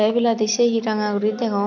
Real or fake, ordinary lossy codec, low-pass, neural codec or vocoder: real; none; 7.2 kHz; none